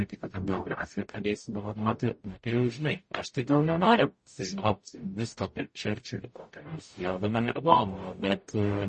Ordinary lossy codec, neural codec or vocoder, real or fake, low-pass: MP3, 32 kbps; codec, 44.1 kHz, 0.9 kbps, DAC; fake; 10.8 kHz